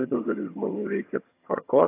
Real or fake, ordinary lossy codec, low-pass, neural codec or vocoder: fake; AAC, 24 kbps; 3.6 kHz; vocoder, 22.05 kHz, 80 mel bands, HiFi-GAN